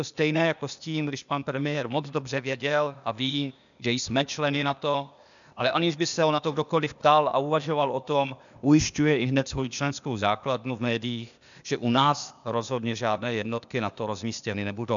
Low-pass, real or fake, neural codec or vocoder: 7.2 kHz; fake; codec, 16 kHz, 0.8 kbps, ZipCodec